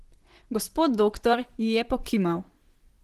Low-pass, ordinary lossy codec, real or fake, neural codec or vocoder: 14.4 kHz; Opus, 16 kbps; fake; vocoder, 44.1 kHz, 128 mel bands, Pupu-Vocoder